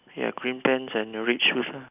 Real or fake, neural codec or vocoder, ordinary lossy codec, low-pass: real; none; none; 3.6 kHz